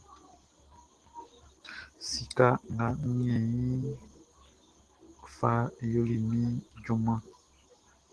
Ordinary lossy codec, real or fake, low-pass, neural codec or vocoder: Opus, 16 kbps; real; 10.8 kHz; none